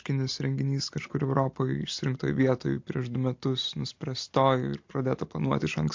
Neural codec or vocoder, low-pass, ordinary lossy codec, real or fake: none; 7.2 kHz; MP3, 48 kbps; real